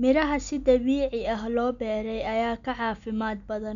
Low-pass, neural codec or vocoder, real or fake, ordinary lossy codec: 7.2 kHz; none; real; MP3, 96 kbps